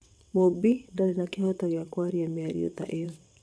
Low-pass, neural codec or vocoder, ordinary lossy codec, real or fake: none; vocoder, 22.05 kHz, 80 mel bands, WaveNeXt; none; fake